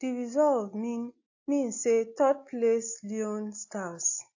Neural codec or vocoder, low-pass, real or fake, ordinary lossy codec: none; 7.2 kHz; real; AAC, 48 kbps